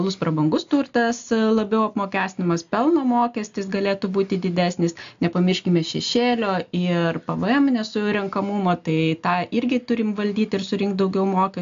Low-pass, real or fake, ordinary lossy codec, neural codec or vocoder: 7.2 kHz; real; AAC, 64 kbps; none